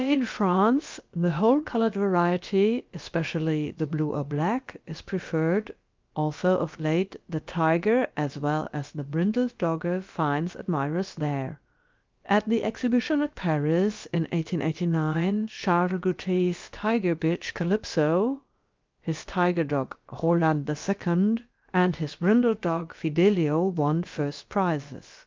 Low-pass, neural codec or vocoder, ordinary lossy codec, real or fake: 7.2 kHz; codec, 16 kHz, about 1 kbps, DyCAST, with the encoder's durations; Opus, 24 kbps; fake